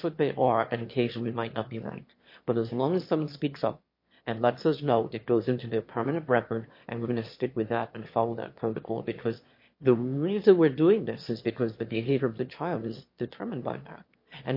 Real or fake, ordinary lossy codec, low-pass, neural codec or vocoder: fake; MP3, 32 kbps; 5.4 kHz; autoencoder, 22.05 kHz, a latent of 192 numbers a frame, VITS, trained on one speaker